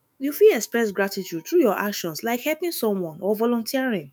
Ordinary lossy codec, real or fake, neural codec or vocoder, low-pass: none; fake; autoencoder, 48 kHz, 128 numbers a frame, DAC-VAE, trained on Japanese speech; none